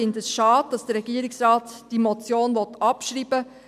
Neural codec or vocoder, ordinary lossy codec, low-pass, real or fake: none; none; 14.4 kHz; real